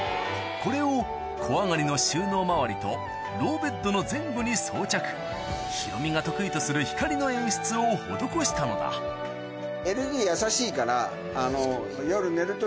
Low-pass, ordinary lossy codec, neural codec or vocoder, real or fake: none; none; none; real